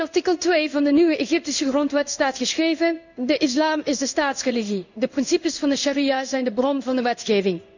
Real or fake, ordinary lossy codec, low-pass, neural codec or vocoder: fake; none; 7.2 kHz; codec, 16 kHz in and 24 kHz out, 1 kbps, XY-Tokenizer